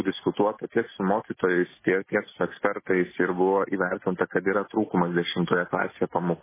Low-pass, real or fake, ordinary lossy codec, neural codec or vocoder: 3.6 kHz; real; MP3, 16 kbps; none